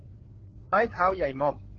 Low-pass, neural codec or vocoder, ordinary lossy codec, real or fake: 7.2 kHz; codec, 16 kHz, 4 kbps, FreqCodec, larger model; Opus, 16 kbps; fake